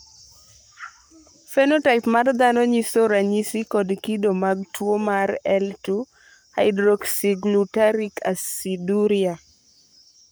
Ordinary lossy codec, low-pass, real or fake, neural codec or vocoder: none; none; fake; codec, 44.1 kHz, 7.8 kbps, Pupu-Codec